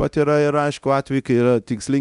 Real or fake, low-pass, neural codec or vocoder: fake; 10.8 kHz; codec, 24 kHz, 0.9 kbps, DualCodec